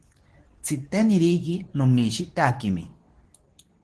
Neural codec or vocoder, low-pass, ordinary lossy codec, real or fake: codec, 24 kHz, 0.9 kbps, WavTokenizer, medium speech release version 2; 10.8 kHz; Opus, 16 kbps; fake